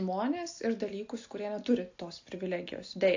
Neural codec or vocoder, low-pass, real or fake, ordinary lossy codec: none; 7.2 kHz; real; AAC, 48 kbps